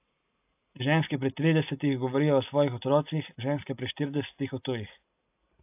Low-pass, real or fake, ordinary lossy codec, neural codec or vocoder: 3.6 kHz; real; AAC, 32 kbps; none